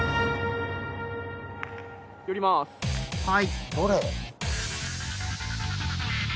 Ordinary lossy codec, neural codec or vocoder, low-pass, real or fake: none; none; none; real